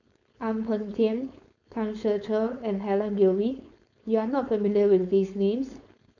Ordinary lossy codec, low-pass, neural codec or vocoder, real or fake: none; 7.2 kHz; codec, 16 kHz, 4.8 kbps, FACodec; fake